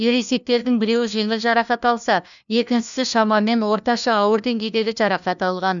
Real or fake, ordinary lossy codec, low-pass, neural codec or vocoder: fake; none; 7.2 kHz; codec, 16 kHz, 1 kbps, FunCodec, trained on LibriTTS, 50 frames a second